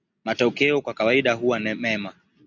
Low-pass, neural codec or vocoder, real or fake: 7.2 kHz; none; real